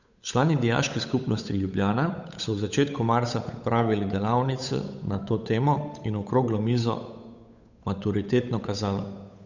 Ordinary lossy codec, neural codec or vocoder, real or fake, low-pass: none; codec, 16 kHz, 8 kbps, FunCodec, trained on Chinese and English, 25 frames a second; fake; 7.2 kHz